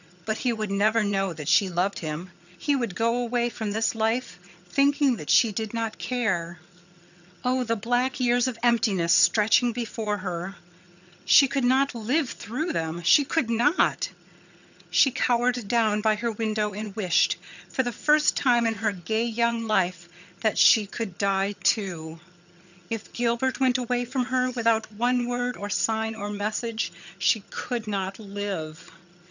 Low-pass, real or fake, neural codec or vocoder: 7.2 kHz; fake; vocoder, 22.05 kHz, 80 mel bands, HiFi-GAN